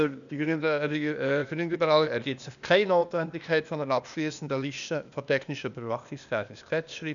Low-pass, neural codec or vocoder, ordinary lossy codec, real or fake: 7.2 kHz; codec, 16 kHz, 0.8 kbps, ZipCodec; none; fake